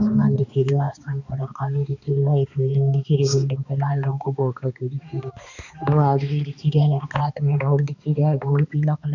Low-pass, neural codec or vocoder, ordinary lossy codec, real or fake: 7.2 kHz; codec, 16 kHz, 2 kbps, X-Codec, HuBERT features, trained on balanced general audio; none; fake